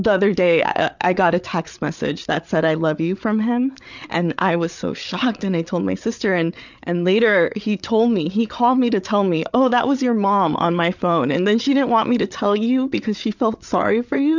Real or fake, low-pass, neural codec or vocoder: fake; 7.2 kHz; codec, 16 kHz, 8 kbps, FreqCodec, larger model